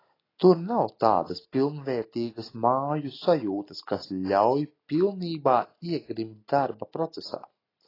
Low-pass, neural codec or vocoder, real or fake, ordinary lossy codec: 5.4 kHz; none; real; AAC, 24 kbps